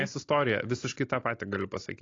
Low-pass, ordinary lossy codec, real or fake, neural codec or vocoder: 7.2 kHz; AAC, 32 kbps; real; none